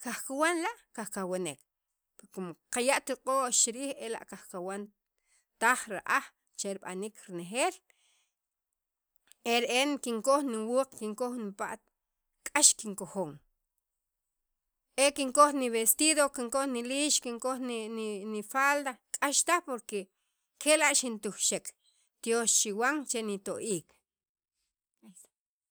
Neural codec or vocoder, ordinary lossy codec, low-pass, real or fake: none; none; none; real